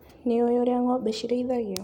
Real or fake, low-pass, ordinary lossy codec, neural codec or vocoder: real; 19.8 kHz; none; none